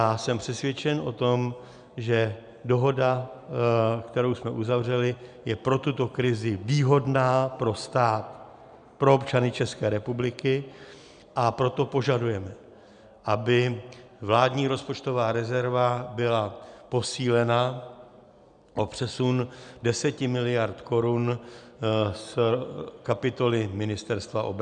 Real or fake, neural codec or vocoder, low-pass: real; none; 9.9 kHz